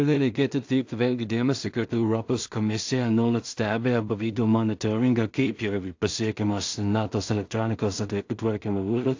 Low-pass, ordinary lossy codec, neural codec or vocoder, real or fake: 7.2 kHz; AAC, 48 kbps; codec, 16 kHz in and 24 kHz out, 0.4 kbps, LongCat-Audio-Codec, two codebook decoder; fake